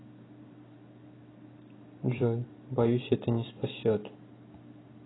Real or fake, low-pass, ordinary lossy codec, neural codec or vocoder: fake; 7.2 kHz; AAC, 16 kbps; vocoder, 44.1 kHz, 128 mel bands every 256 samples, BigVGAN v2